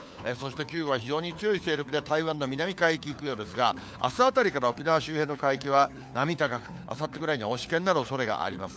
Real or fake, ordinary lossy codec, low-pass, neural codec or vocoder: fake; none; none; codec, 16 kHz, 4 kbps, FunCodec, trained on LibriTTS, 50 frames a second